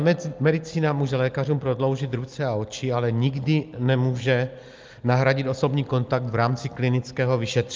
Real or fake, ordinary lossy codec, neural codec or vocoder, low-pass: real; Opus, 24 kbps; none; 7.2 kHz